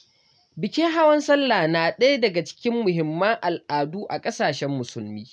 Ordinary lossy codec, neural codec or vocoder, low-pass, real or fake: none; none; none; real